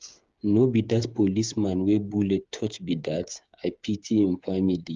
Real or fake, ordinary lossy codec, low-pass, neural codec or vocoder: fake; Opus, 32 kbps; 7.2 kHz; codec, 16 kHz, 8 kbps, FreqCodec, smaller model